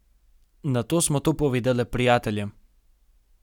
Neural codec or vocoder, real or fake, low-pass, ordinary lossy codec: none; real; 19.8 kHz; none